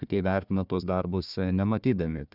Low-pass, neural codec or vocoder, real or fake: 5.4 kHz; codec, 16 kHz, 1 kbps, FunCodec, trained on Chinese and English, 50 frames a second; fake